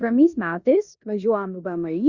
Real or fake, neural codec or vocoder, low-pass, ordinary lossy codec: fake; codec, 16 kHz in and 24 kHz out, 0.9 kbps, LongCat-Audio-Codec, four codebook decoder; 7.2 kHz; Opus, 64 kbps